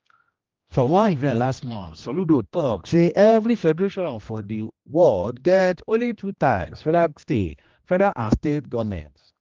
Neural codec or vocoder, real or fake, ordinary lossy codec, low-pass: codec, 16 kHz, 1 kbps, X-Codec, HuBERT features, trained on general audio; fake; Opus, 32 kbps; 7.2 kHz